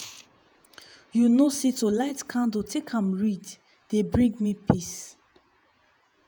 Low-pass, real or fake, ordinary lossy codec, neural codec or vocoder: none; fake; none; vocoder, 48 kHz, 128 mel bands, Vocos